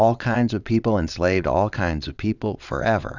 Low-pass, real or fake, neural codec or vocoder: 7.2 kHz; fake; vocoder, 22.05 kHz, 80 mel bands, WaveNeXt